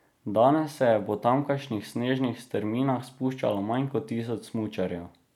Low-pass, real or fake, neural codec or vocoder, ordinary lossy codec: 19.8 kHz; real; none; none